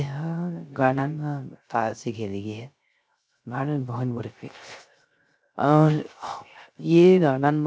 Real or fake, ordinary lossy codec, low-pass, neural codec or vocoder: fake; none; none; codec, 16 kHz, 0.3 kbps, FocalCodec